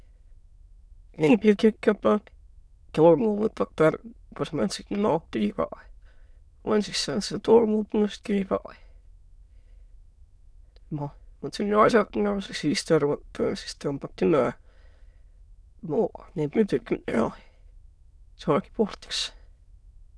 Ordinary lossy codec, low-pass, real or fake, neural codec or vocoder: none; none; fake; autoencoder, 22.05 kHz, a latent of 192 numbers a frame, VITS, trained on many speakers